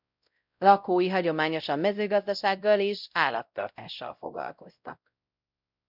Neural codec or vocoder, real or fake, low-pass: codec, 16 kHz, 0.5 kbps, X-Codec, WavLM features, trained on Multilingual LibriSpeech; fake; 5.4 kHz